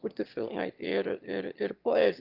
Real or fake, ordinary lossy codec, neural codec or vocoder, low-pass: fake; Opus, 32 kbps; autoencoder, 22.05 kHz, a latent of 192 numbers a frame, VITS, trained on one speaker; 5.4 kHz